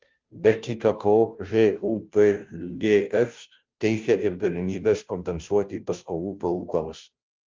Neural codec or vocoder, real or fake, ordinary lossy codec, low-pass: codec, 16 kHz, 0.5 kbps, FunCodec, trained on Chinese and English, 25 frames a second; fake; Opus, 24 kbps; 7.2 kHz